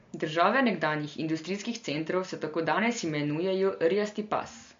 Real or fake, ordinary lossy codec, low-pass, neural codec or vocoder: real; MP3, 48 kbps; 7.2 kHz; none